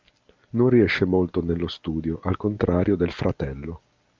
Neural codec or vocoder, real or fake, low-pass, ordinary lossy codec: none; real; 7.2 kHz; Opus, 24 kbps